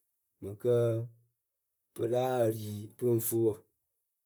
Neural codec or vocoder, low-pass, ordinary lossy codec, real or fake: vocoder, 44.1 kHz, 128 mel bands, Pupu-Vocoder; none; none; fake